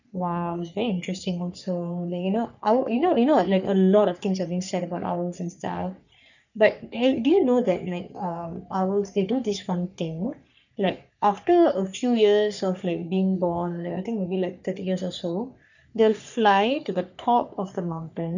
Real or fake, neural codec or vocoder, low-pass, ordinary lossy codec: fake; codec, 44.1 kHz, 3.4 kbps, Pupu-Codec; 7.2 kHz; none